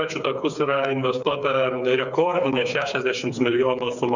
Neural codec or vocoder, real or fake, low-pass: codec, 16 kHz, 4 kbps, FreqCodec, smaller model; fake; 7.2 kHz